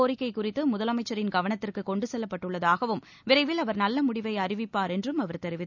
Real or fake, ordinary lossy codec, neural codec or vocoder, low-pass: real; none; none; 7.2 kHz